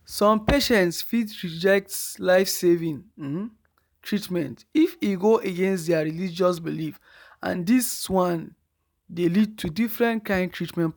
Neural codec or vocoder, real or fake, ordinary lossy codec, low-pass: none; real; none; none